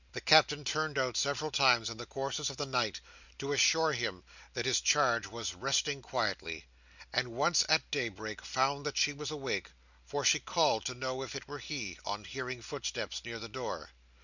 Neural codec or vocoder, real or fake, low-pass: none; real; 7.2 kHz